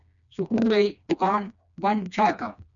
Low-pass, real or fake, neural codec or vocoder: 7.2 kHz; fake; codec, 16 kHz, 2 kbps, FreqCodec, smaller model